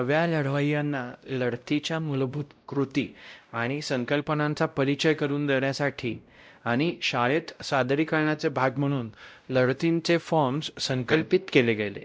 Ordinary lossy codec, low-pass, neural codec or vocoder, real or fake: none; none; codec, 16 kHz, 0.5 kbps, X-Codec, WavLM features, trained on Multilingual LibriSpeech; fake